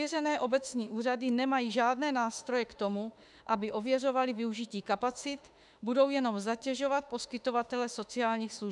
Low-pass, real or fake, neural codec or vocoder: 10.8 kHz; fake; autoencoder, 48 kHz, 32 numbers a frame, DAC-VAE, trained on Japanese speech